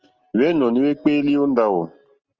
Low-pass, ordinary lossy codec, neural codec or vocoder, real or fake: 7.2 kHz; Opus, 24 kbps; none; real